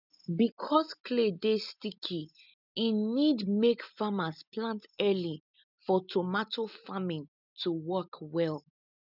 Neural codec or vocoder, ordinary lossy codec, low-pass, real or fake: none; none; 5.4 kHz; real